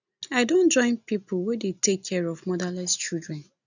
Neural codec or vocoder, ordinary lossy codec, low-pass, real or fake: none; none; 7.2 kHz; real